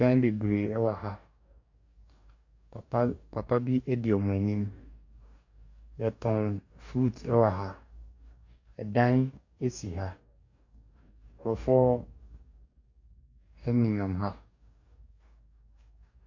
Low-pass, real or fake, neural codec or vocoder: 7.2 kHz; fake; codec, 44.1 kHz, 2.6 kbps, DAC